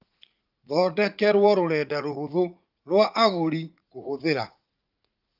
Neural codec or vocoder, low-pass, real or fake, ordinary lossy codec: vocoder, 44.1 kHz, 80 mel bands, Vocos; 5.4 kHz; fake; Opus, 24 kbps